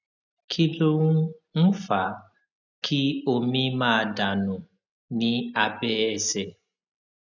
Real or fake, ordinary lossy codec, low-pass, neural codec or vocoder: real; none; 7.2 kHz; none